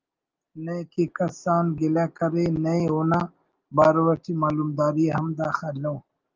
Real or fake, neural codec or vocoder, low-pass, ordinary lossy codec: real; none; 7.2 kHz; Opus, 24 kbps